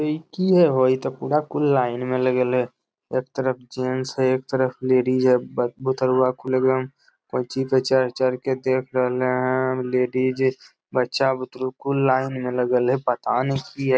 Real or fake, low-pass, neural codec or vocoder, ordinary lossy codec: real; none; none; none